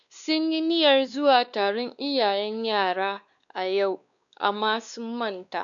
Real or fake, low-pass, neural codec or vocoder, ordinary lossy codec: fake; 7.2 kHz; codec, 16 kHz, 2 kbps, X-Codec, WavLM features, trained on Multilingual LibriSpeech; none